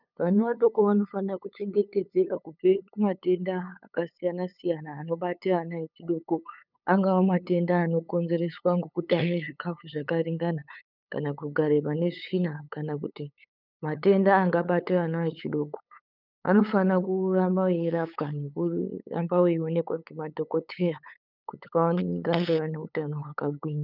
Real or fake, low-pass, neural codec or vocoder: fake; 5.4 kHz; codec, 16 kHz, 8 kbps, FunCodec, trained on LibriTTS, 25 frames a second